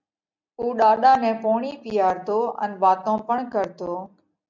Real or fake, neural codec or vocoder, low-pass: real; none; 7.2 kHz